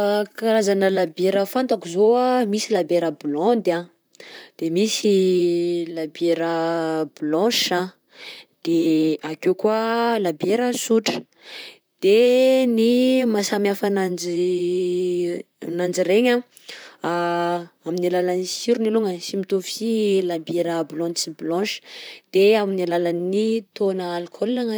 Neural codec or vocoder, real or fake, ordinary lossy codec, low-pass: vocoder, 44.1 kHz, 128 mel bands, Pupu-Vocoder; fake; none; none